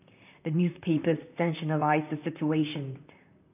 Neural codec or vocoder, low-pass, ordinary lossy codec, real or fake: vocoder, 44.1 kHz, 128 mel bands, Pupu-Vocoder; 3.6 kHz; none; fake